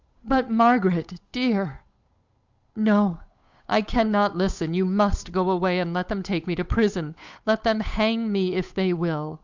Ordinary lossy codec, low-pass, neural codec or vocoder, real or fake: Opus, 64 kbps; 7.2 kHz; codec, 16 kHz, 8 kbps, FunCodec, trained on Chinese and English, 25 frames a second; fake